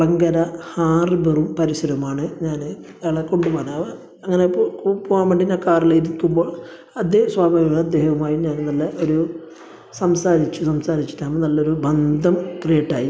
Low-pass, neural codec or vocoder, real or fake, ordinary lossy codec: none; none; real; none